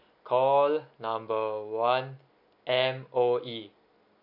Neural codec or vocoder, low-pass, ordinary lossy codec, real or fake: none; 5.4 kHz; MP3, 48 kbps; real